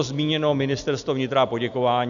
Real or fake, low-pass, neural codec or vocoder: real; 7.2 kHz; none